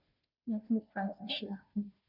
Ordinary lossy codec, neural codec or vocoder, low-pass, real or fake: none; codec, 16 kHz, 0.5 kbps, FunCodec, trained on Chinese and English, 25 frames a second; 5.4 kHz; fake